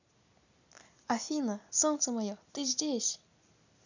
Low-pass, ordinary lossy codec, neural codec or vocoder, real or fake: 7.2 kHz; none; none; real